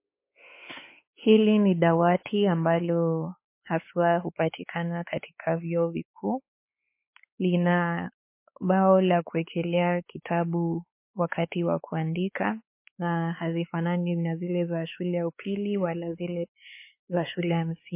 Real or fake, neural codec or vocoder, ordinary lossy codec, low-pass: fake; codec, 16 kHz, 4 kbps, X-Codec, WavLM features, trained on Multilingual LibriSpeech; MP3, 24 kbps; 3.6 kHz